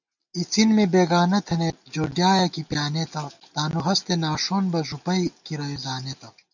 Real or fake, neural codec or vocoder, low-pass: real; none; 7.2 kHz